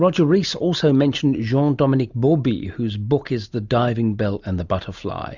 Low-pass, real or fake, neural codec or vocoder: 7.2 kHz; real; none